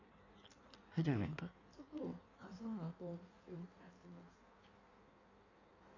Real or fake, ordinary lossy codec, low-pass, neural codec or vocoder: fake; none; 7.2 kHz; codec, 16 kHz in and 24 kHz out, 1.1 kbps, FireRedTTS-2 codec